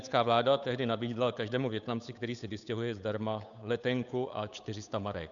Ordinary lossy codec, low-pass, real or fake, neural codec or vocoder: MP3, 96 kbps; 7.2 kHz; fake; codec, 16 kHz, 8 kbps, FunCodec, trained on Chinese and English, 25 frames a second